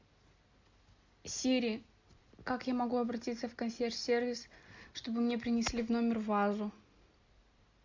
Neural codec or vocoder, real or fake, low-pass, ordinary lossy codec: none; real; 7.2 kHz; MP3, 64 kbps